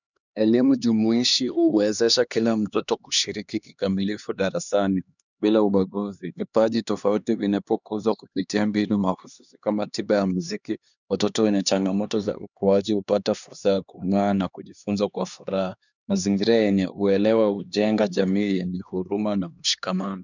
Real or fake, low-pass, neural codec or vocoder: fake; 7.2 kHz; codec, 16 kHz, 2 kbps, X-Codec, HuBERT features, trained on LibriSpeech